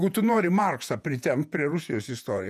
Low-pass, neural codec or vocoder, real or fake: 14.4 kHz; vocoder, 48 kHz, 128 mel bands, Vocos; fake